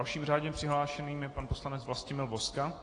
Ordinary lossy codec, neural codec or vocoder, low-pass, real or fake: AAC, 32 kbps; none; 9.9 kHz; real